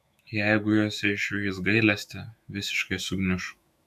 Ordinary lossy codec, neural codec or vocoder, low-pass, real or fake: AAC, 96 kbps; autoencoder, 48 kHz, 128 numbers a frame, DAC-VAE, trained on Japanese speech; 14.4 kHz; fake